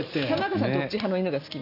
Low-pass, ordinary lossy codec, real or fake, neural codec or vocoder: 5.4 kHz; none; real; none